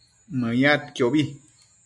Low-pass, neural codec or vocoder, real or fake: 10.8 kHz; none; real